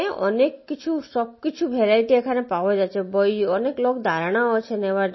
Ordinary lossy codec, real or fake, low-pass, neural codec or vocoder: MP3, 24 kbps; real; 7.2 kHz; none